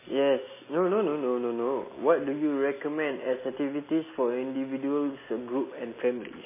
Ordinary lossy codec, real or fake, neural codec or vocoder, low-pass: MP3, 16 kbps; real; none; 3.6 kHz